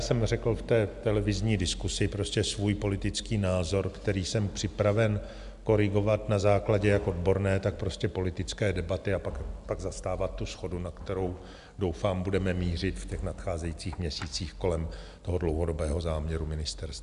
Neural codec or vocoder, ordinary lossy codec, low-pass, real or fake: none; AAC, 96 kbps; 10.8 kHz; real